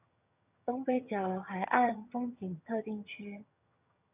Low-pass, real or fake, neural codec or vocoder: 3.6 kHz; fake; vocoder, 22.05 kHz, 80 mel bands, HiFi-GAN